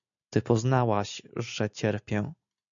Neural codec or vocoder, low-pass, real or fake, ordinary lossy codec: none; 7.2 kHz; real; MP3, 96 kbps